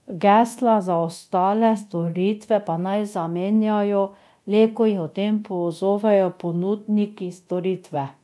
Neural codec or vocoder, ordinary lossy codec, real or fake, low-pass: codec, 24 kHz, 0.9 kbps, DualCodec; none; fake; none